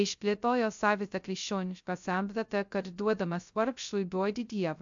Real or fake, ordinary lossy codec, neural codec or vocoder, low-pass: fake; AAC, 64 kbps; codec, 16 kHz, 0.2 kbps, FocalCodec; 7.2 kHz